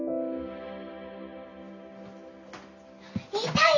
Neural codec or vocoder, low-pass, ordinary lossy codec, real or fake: none; 7.2 kHz; none; real